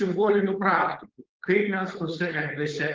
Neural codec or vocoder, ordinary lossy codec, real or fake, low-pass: codec, 16 kHz, 4.8 kbps, FACodec; Opus, 16 kbps; fake; 7.2 kHz